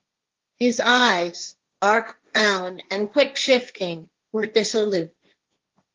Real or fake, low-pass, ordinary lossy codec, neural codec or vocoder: fake; 7.2 kHz; Opus, 32 kbps; codec, 16 kHz, 1.1 kbps, Voila-Tokenizer